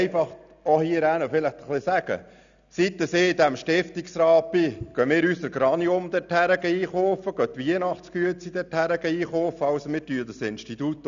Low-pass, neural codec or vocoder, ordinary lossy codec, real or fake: 7.2 kHz; none; none; real